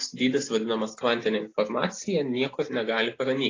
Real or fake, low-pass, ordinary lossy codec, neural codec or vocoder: real; 7.2 kHz; AAC, 32 kbps; none